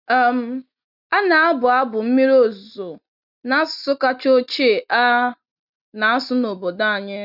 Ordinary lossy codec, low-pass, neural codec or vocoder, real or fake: none; 5.4 kHz; none; real